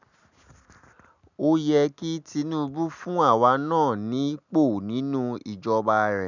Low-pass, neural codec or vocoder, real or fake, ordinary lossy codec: 7.2 kHz; none; real; none